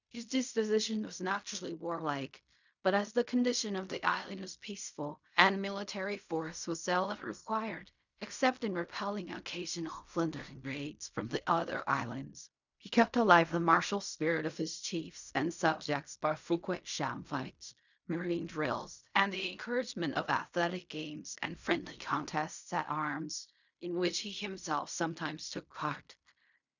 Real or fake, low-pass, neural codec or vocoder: fake; 7.2 kHz; codec, 16 kHz in and 24 kHz out, 0.4 kbps, LongCat-Audio-Codec, fine tuned four codebook decoder